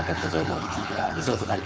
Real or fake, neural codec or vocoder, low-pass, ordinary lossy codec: fake; codec, 16 kHz, 4.8 kbps, FACodec; none; none